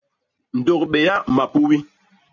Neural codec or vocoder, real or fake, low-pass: none; real; 7.2 kHz